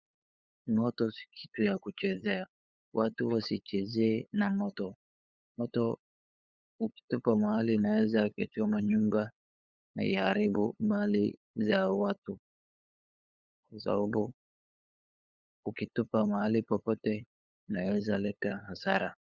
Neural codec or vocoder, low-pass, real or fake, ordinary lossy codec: codec, 16 kHz, 8 kbps, FunCodec, trained on LibriTTS, 25 frames a second; 7.2 kHz; fake; Opus, 64 kbps